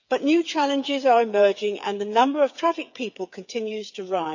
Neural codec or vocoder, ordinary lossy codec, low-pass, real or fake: codec, 16 kHz, 8 kbps, FreqCodec, smaller model; none; 7.2 kHz; fake